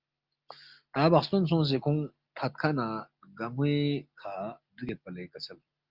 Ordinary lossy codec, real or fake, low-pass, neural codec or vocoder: Opus, 32 kbps; real; 5.4 kHz; none